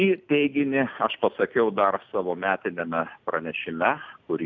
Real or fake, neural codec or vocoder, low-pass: real; none; 7.2 kHz